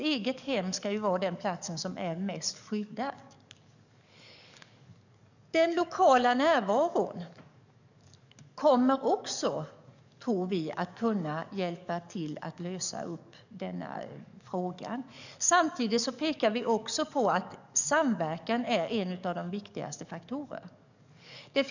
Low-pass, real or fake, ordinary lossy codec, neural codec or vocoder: 7.2 kHz; fake; none; codec, 44.1 kHz, 7.8 kbps, DAC